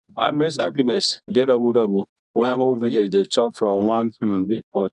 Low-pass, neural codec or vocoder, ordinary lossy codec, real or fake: 10.8 kHz; codec, 24 kHz, 0.9 kbps, WavTokenizer, medium music audio release; none; fake